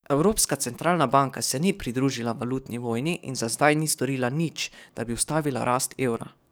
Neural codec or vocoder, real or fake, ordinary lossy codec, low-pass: codec, 44.1 kHz, 7.8 kbps, DAC; fake; none; none